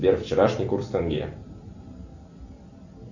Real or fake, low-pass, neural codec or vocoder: real; 7.2 kHz; none